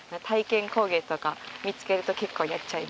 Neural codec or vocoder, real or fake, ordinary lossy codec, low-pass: none; real; none; none